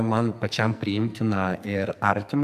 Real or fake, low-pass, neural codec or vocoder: fake; 14.4 kHz; codec, 44.1 kHz, 2.6 kbps, SNAC